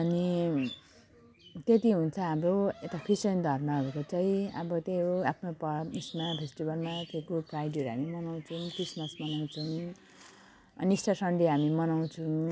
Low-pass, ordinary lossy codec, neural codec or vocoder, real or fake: none; none; none; real